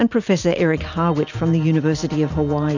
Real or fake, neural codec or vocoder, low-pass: real; none; 7.2 kHz